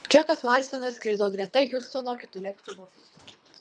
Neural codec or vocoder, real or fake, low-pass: codec, 24 kHz, 3 kbps, HILCodec; fake; 9.9 kHz